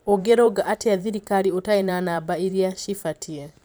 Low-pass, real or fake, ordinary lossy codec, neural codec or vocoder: none; fake; none; vocoder, 44.1 kHz, 128 mel bands every 512 samples, BigVGAN v2